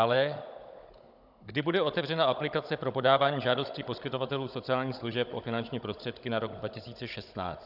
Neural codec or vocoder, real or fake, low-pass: codec, 16 kHz, 16 kbps, FunCodec, trained on Chinese and English, 50 frames a second; fake; 5.4 kHz